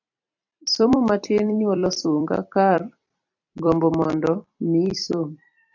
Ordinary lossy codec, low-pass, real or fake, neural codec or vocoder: AAC, 48 kbps; 7.2 kHz; fake; vocoder, 44.1 kHz, 128 mel bands every 256 samples, BigVGAN v2